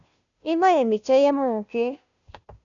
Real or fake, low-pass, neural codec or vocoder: fake; 7.2 kHz; codec, 16 kHz, 0.5 kbps, FunCodec, trained on Chinese and English, 25 frames a second